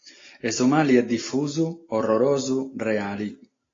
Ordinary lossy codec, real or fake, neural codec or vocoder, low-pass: AAC, 32 kbps; real; none; 7.2 kHz